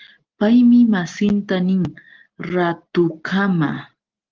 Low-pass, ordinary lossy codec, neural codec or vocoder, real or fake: 7.2 kHz; Opus, 16 kbps; none; real